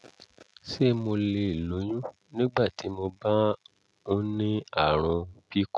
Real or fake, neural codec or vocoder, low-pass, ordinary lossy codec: real; none; none; none